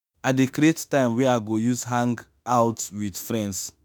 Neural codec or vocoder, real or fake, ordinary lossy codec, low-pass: autoencoder, 48 kHz, 32 numbers a frame, DAC-VAE, trained on Japanese speech; fake; none; none